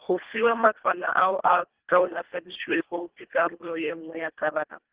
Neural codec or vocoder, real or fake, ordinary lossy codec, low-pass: codec, 24 kHz, 1.5 kbps, HILCodec; fake; Opus, 16 kbps; 3.6 kHz